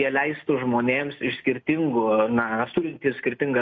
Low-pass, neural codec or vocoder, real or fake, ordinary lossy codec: 7.2 kHz; none; real; MP3, 48 kbps